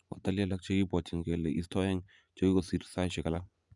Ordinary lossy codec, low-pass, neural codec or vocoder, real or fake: none; 10.8 kHz; vocoder, 24 kHz, 100 mel bands, Vocos; fake